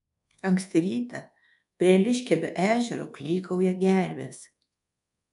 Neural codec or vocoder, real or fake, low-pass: codec, 24 kHz, 1.2 kbps, DualCodec; fake; 10.8 kHz